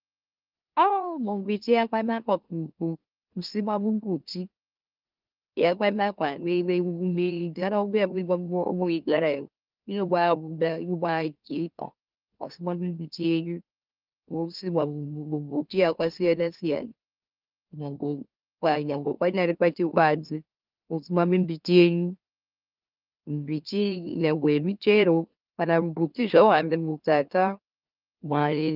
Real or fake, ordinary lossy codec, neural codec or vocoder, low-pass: fake; Opus, 24 kbps; autoencoder, 44.1 kHz, a latent of 192 numbers a frame, MeloTTS; 5.4 kHz